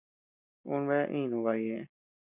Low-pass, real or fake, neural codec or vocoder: 3.6 kHz; real; none